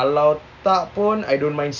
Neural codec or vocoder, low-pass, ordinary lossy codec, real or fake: none; 7.2 kHz; none; real